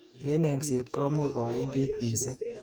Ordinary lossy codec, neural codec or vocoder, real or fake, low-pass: none; codec, 44.1 kHz, 2.6 kbps, DAC; fake; none